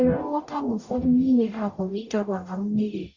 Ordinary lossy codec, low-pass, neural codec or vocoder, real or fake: Opus, 64 kbps; 7.2 kHz; codec, 44.1 kHz, 0.9 kbps, DAC; fake